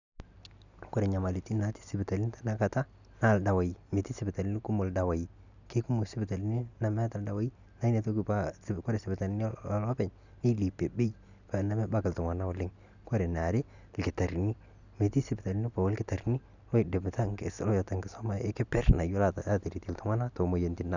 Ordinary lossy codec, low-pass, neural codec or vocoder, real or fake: none; 7.2 kHz; none; real